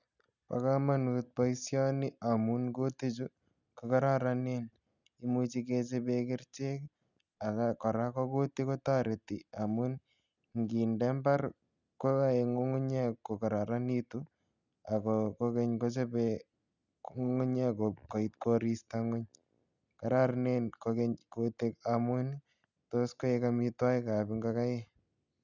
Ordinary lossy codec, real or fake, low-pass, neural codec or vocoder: none; real; 7.2 kHz; none